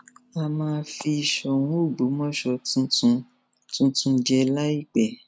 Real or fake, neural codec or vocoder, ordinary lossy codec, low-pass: real; none; none; none